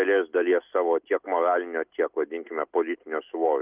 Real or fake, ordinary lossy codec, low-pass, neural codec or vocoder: real; Opus, 32 kbps; 3.6 kHz; none